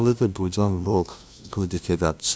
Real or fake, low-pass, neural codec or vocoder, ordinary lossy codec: fake; none; codec, 16 kHz, 0.5 kbps, FunCodec, trained on LibriTTS, 25 frames a second; none